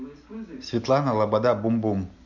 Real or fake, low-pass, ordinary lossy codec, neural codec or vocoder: real; 7.2 kHz; none; none